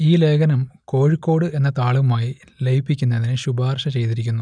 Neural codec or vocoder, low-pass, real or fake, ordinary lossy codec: none; 9.9 kHz; real; none